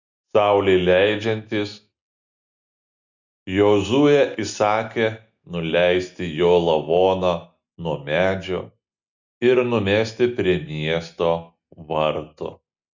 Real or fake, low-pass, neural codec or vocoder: real; 7.2 kHz; none